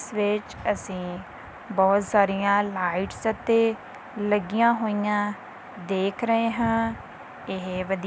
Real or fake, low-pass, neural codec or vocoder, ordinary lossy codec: real; none; none; none